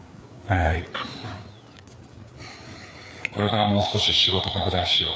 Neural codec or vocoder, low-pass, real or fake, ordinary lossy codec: codec, 16 kHz, 4 kbps, FreqCodec, larger model; none; fake; none